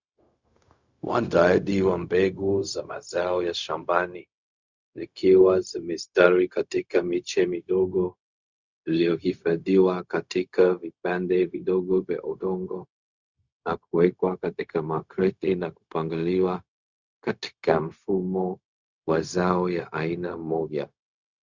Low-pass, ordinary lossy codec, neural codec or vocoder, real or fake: 7.2 kHz; Opus, 64 kbps; codec, 16 kHz, 0.4 kbps, LongCat-Audio-Codec; fake